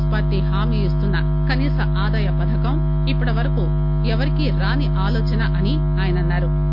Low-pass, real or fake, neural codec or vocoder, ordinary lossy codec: 5.4 kHz; real; none; none